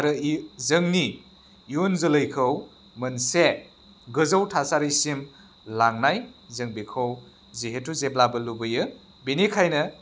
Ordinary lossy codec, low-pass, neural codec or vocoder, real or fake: none; none; none; real